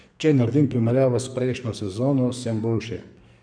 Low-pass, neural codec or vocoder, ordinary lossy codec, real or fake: 9.9 kHz; codec, 44.1 kHz, 2.6 kbps, SNAC; none; fake